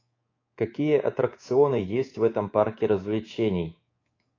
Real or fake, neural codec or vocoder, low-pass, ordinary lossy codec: fake; codec, 24 kHz, 3.1 kbps, DualCodec; 7.2 kHz; AAC, 32 kbps